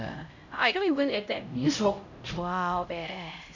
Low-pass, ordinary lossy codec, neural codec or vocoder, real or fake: 7.2 kHz; none; codec, 16 kHz, 0.5 kbps, X-Codec, HuBERT features, trained on LibriSpeech; fake